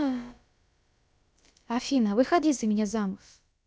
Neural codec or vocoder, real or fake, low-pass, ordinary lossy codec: codec, 16 kHz, about 1 kbps, DyCAST, with the encoder's durations; fake; none; none